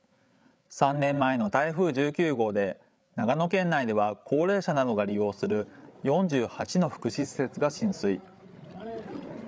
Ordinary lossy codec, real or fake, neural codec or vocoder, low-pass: none; fake; codec, 16 kHz, 8 kbps, FreqCodec, larger model; none